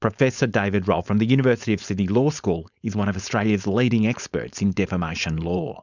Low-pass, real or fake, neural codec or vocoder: 7.2 kHz; fake; codec, 16 kHz, 4.8 kbps, FACodec